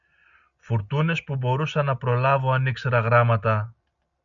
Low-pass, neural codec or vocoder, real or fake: 7.2 kHz; none; real